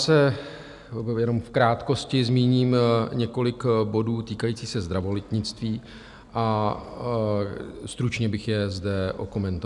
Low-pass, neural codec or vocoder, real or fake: 10.8 kHz; none; real